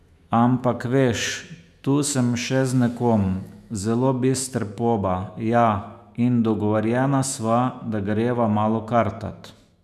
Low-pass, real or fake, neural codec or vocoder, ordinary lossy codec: 14.4 kHz; fake; autoencoder, 48 kHz, 128 numbers a frame, DAC-VAE, trained on Japanese speech; none